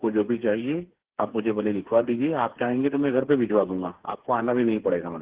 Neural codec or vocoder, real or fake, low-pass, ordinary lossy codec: codec, 16 kHz, 4 kbps, FreqCodec, smaller model; fake; 3.6 kHz; Opus, 16 kbps